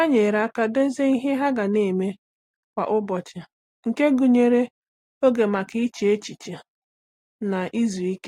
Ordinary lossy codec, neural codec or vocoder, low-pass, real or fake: AAC, 48 kbps; none; 19.8 kHz; real